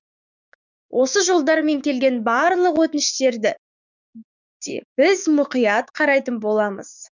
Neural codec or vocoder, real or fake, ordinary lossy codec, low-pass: codec, 16 kHz, 6 kbps, DAC; fake; none; 7.2 kHz